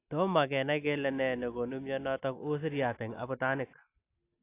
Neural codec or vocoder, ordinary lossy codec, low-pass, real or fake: none; AAC, 24 kbps; 3.6 kHz; real